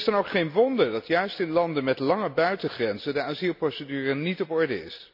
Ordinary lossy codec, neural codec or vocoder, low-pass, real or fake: none; none; 5.4 kHz; real